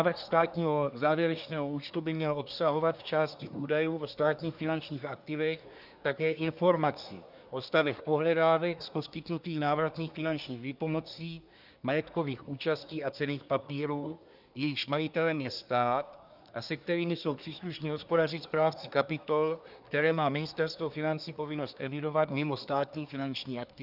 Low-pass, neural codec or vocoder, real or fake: 5.4 kHz; codec, 24 kHz, 1 kbps, SNAC; fake